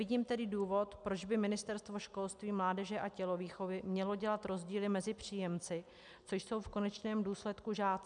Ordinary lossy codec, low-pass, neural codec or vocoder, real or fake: MP3, 96 kbps; 9.9 kHz; none; real